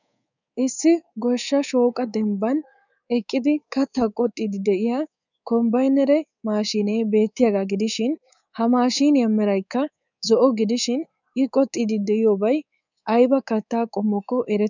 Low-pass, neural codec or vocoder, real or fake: 7.2 kHz; codec, 24 kHz, 3.1 kbps, DualCodec; fake